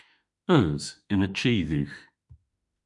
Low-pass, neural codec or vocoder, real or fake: 10.8 kHz; autoencoder, 48 kHz, 32 numbers a frame, DAC-VAE, trained on Japanese speech; fake